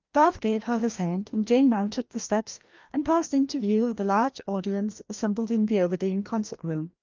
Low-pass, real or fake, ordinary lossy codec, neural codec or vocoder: 7.2 kHz; fake; Opus, 32 kbps; codec, 16 kHz, 1 kbps, FreqCodec, larger model